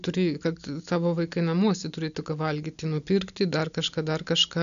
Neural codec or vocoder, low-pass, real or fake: none; 7.2 kHz; real